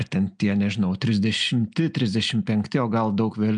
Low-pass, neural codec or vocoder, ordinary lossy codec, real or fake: 9.9 kHz; none; MP3, 96 kbps; real